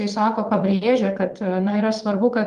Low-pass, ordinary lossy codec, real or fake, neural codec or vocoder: 9.9 kHz; Opus, 24 kbps; fake; vocoder, 22.05 kHz, 80 mel bands, WaveNeXt